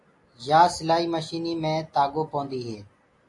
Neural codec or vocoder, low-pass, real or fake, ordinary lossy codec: none; 10.8 kHz; real; AAC, 48 kbps